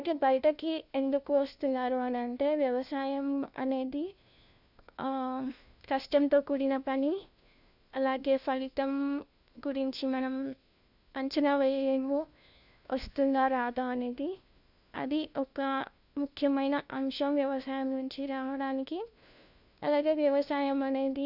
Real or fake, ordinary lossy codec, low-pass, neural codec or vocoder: fake; none; 5.4 kHz; codec, 16 kHz, 1 kbps, FunCodec, trained on LibriTTS, 50 frames a second